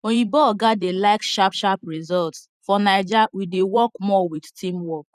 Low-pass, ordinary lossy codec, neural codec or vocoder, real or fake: 14.4 kHz; none; vocoder, 44.1 kHz, 128 mel bands every 512 samples, BigVGAN v2; fake